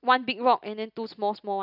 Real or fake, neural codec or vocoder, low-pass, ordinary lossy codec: real; none; 5.4 kHz; none